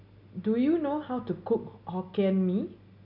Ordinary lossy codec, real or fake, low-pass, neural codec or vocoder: none; real; 5.4 kHz; none